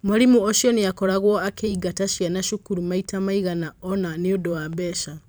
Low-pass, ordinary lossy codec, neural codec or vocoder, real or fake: none; none; vocoder, 44.1 kHz, 128 mel bands every 512 samples, BigVGAN v2; fake